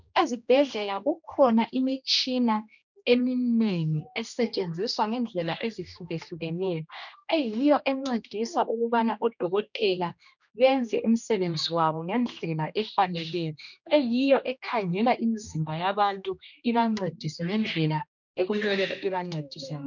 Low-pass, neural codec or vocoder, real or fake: 7.2 kHz; codec, 16 kHz, 1 kbps, X-Codec, HuBERT features, trained on general audio; fake